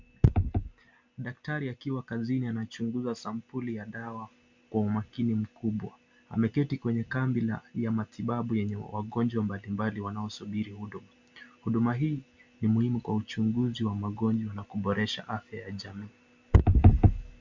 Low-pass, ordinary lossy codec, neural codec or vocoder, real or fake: 7.2 kHz; MP3, 64 kbps; none; real